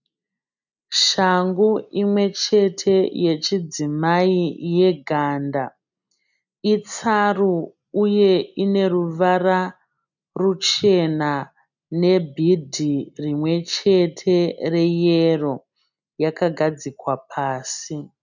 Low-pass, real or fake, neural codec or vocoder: 7.2 kHz; real; none